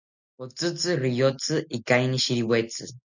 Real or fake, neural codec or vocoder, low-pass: real; none; 7.2 kHz